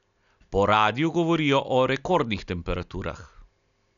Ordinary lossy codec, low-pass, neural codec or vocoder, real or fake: none; 7.2 kHz; none; real